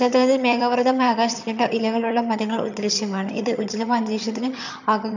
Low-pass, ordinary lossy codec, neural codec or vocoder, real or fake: 7.2 kHz; none; vocoder, 22.05 kHz, 80 mel bands, HiFi-GAN; fake